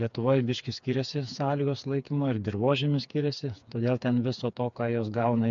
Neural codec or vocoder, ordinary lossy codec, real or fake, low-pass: codec, 16 kHz, 4 kbps, FreqCodec, smaller model; MP3, 64 kbps; fake; 7.2 kHz